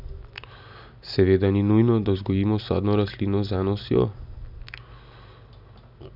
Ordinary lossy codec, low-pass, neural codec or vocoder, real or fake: none; 5.4 kHz; none; real